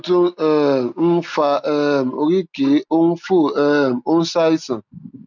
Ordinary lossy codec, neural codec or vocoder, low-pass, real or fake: none; none; 7.2 kHz; real